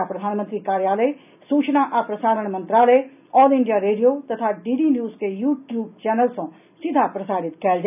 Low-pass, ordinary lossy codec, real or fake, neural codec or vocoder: 3.6 kHz; none; real; none